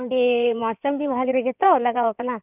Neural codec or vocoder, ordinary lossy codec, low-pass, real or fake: codec, 16 kHz in and 24 kHz out, 2.2 kbps, FireRedTTS-2 codec; none; 3.6 kHz; fake